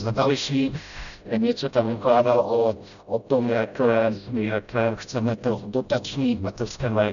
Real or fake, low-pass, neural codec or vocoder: fake; 7.2 kHz; codec, 16 kHz, 0.5 kbps, FreqCodec, smaller model